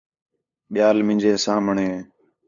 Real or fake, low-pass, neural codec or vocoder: fake; 7.2 kHz; codec, 16 kHz, 8 kbps, FunCodec, trained on LibriTTS, 25 frames a second